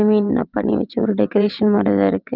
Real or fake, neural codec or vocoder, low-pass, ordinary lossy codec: fake; vocoder, 22.05 kHz, 80 mel bands, WaveNeXt; 5.4 kHz; Opus, 24 kbps